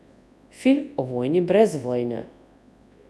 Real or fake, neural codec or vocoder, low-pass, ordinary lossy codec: fake; codec, 24 kHz, 0.9 kbps, WavTokenizer, large speech release; none; none